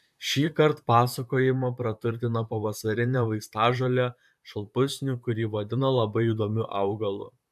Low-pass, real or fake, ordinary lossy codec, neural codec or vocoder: 14.4 kHz; fake; AAC, 96 kbps; vocoder, 44.1 kHz, 128 mel bands, Pupu-Vocoder